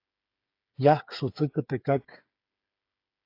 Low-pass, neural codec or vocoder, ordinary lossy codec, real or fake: 5.4 kHz; codec, 16 kHz, 8 kbps, FreqCodec, smaller model; AAC, 32 kbps; fake